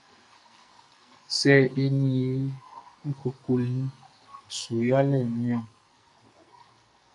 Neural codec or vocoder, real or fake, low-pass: codec, 44.1 kHz, 2.6 kbps, SNAC; fake; 10.8 kHz